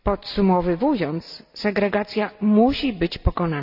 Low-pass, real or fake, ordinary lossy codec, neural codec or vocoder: 5.4 kHz; real; none; none